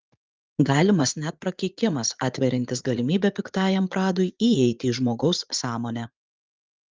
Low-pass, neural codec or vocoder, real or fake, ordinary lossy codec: 7.2 kHz; vocoder, 44.1 kHz, 80 mel bands, Vocos; fake; Opus, 24 kbps